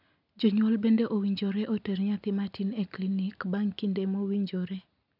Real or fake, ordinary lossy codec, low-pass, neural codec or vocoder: real; none; 5.4 kHz; none